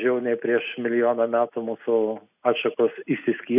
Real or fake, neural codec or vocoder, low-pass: real; none; 3.6 kHz